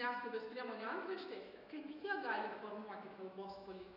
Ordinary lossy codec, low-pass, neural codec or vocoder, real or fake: AAC, 32 kbps; 5.4 kHz; autoencoder, 48 kHz, 128 numbers a frame, DAC-VAE, trained on Japanese speech; fake